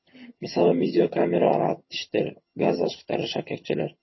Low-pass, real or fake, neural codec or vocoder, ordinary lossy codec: 7.2 kHz; fake; vocoder, 22.05 kHz, 80 mel bands, HiFi-GAN; MP3, 24 kbps